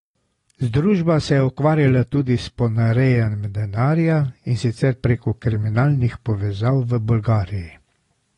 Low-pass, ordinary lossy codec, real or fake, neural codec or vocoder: 10.8 kHz; AAC, 32 kbps; real; none